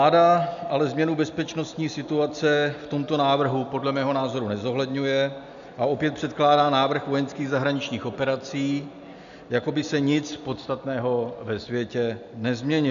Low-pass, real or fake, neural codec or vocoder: 7.2 kHz; real; none